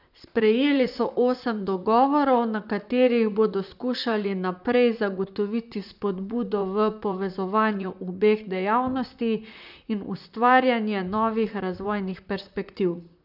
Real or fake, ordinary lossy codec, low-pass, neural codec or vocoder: fake; none; 5.4 kHz; vocoder, 44.1 kHz, 128 mel bands, Pupu-Vocoder